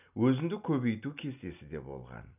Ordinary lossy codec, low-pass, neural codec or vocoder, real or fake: none; 3.6 kHz; none; real